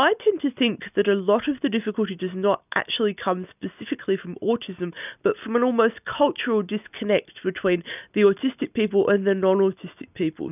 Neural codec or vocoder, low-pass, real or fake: none; 3.6 kHz; real